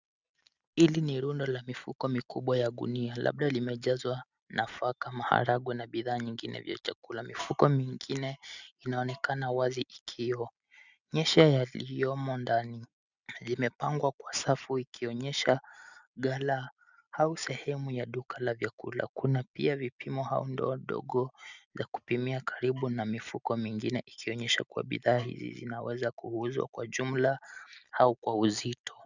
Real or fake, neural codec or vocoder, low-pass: real; none; 7.2 kHz